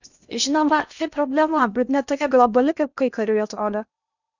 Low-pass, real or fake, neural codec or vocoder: 7.2 kHz; fake; codec, 16 kHz in and 24 kHz out, 0.6 kbps, FocalCodec, streaming, 2048 codes